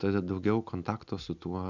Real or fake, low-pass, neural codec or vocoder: real; 7.2 kHz; none